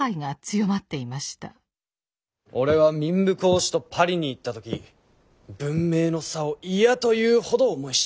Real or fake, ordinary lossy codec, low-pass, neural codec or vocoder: real; none; none; none